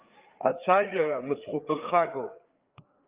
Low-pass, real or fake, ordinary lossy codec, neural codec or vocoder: 3.6 kHz; fake; Opus, 32 kbps; codec, 16 kHz, 4 kbps, FreqCodec, larger model